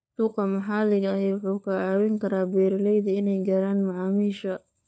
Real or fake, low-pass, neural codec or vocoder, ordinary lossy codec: fake; none; codec, 16 kHz, 4 kbps, FunCodec, trained on LibriTTS, 50 frames a second; none